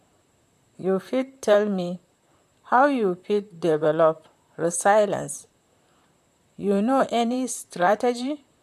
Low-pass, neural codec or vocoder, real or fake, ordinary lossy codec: 14.4 kHz; vocoder, 44.1 kHz, 128 mel bands, Pupu-Vocoder; fake; MP3, 96 kbps